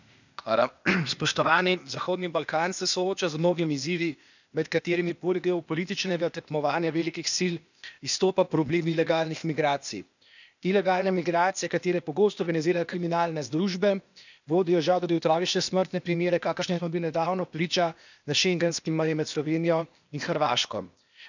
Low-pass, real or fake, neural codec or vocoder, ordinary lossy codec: 7.2 kHz; fake; codec, 16 kHz, 0.8 kbps, ZipCodec; none